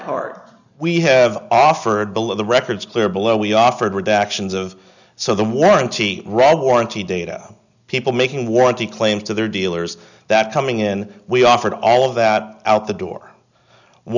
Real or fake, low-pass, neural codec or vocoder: real; 7.2 kHz; none